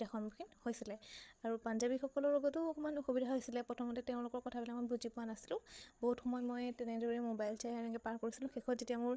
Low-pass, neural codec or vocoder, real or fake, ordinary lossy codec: none; codec, 16 kHz, 8 kbps, FreqCodec, larger model; fake; none